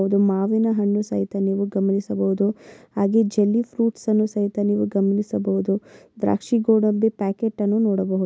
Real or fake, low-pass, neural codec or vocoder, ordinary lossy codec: real; none; none; none